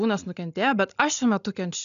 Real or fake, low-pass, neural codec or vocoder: real; 7.2 kHz; none